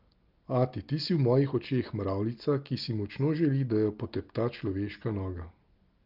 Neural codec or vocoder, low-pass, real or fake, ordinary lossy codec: none; 5.4 kHz; real; Opus, 32 kbps